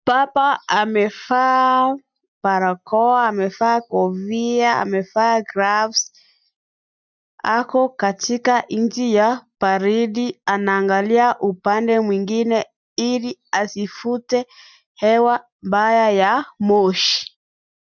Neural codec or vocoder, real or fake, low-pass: none; real; 7.2 kHz